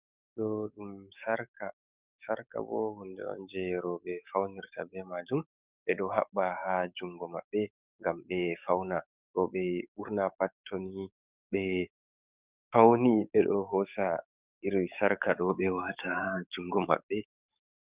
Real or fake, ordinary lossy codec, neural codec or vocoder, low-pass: fake; Opus, 64 kbps; codec, 44.1 kHz, 7.8 kbps, DAC; 3.6 kHz